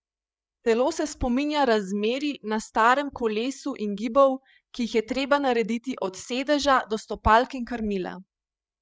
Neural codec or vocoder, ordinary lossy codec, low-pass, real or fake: codec, 16 kHz, 8 kbps, FreqCodec, larger model; none; none; fake